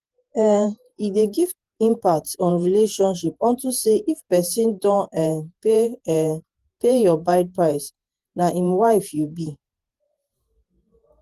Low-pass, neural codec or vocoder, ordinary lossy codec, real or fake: 14.4 kHz; vocoder, 48 kHz, 128 mel bands, Vocos; Opus, 24 kbps; fake